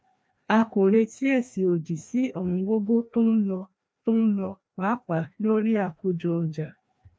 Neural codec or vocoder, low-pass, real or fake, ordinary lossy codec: codec, 16 kHz, 1 kbps, FreqCodec, larger model; none; fake; none